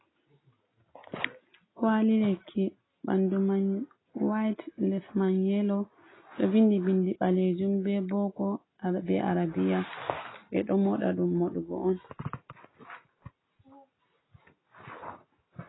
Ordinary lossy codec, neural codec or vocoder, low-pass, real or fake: AAC, 16 kbps; none; 7.2 kHz; real